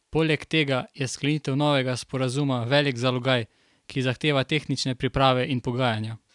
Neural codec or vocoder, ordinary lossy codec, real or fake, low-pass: none; none; real; 10.8 kHz